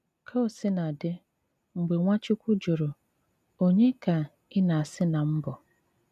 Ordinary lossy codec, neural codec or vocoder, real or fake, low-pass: none; none; real; 14.4 kHz